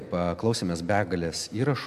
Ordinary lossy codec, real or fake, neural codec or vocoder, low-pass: AAC, 96 kbps; real; none; 14.4 kHz